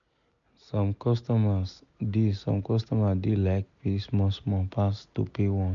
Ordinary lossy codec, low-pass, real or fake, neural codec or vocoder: none; 7.2 kHz; real; none